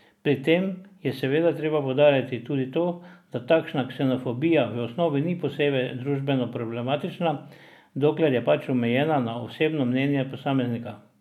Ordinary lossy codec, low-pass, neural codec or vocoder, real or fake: none; 19.8 kHz; none; real